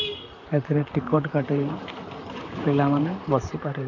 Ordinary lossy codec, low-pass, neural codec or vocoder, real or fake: none; 7.2 kHz; vocoder, 44.1 kHz, 128 mel bands, Pupu-Vocoder; fake